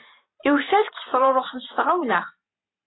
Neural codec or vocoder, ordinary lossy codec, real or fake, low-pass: vocoder, 44.1 kHz, 128 mel bands every 512 samples, BigVGAN v2; AAC, 16 kbps; fake; 7.2 kHz